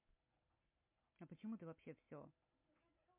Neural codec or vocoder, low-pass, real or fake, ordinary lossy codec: none; 3.6 kHz; real; MP3, 24 kbps